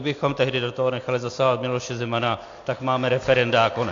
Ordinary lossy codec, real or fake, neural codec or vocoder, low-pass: AAC, 48 kbps; real; none; 7.2 kHz